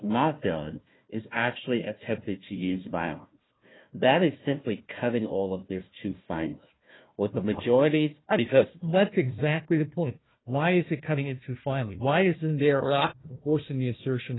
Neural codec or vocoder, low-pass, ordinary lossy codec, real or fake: codec, 16 kHz, 1 kbps, FunCodec, trained on Chinese and English, 50 frames a second; 7.2 kHz; AAC, 16 kbps; fake